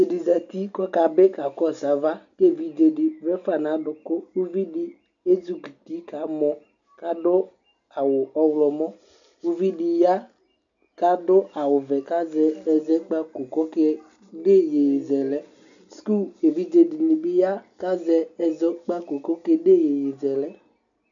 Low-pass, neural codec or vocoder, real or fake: 7.2 kHz; none; real